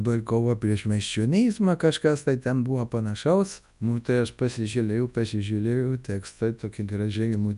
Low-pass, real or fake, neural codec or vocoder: 10.8 kHz; fake; codec, 24 kHz, 0.9 kbps, WavTokenizer, large speech release